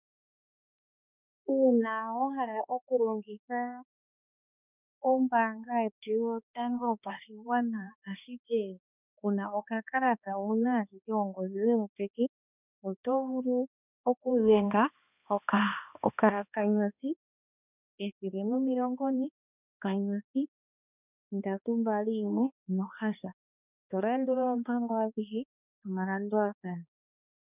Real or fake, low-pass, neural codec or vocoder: fake; 3.6 kHz; codec, 16 kHz, 2 kbps, X-Codec, HuBERT features, trained on balanced general audio